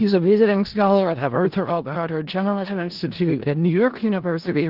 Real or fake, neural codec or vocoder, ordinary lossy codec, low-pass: fake; codec, 16 kHz in and 24 kHz out, 0.4 kbps, LongCat-Audio-Codec, four codebook decoder; Opus, 16 kbps; 5.4 kHz